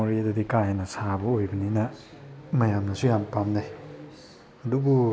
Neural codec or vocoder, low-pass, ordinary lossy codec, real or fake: none; none; none; real